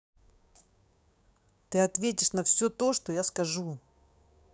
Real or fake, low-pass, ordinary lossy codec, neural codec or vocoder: fake; none; none; codec, 16 kHz, 6 kbps, DAC